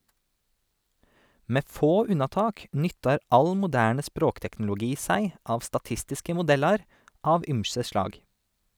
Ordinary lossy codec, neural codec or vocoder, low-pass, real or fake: none; none; none; real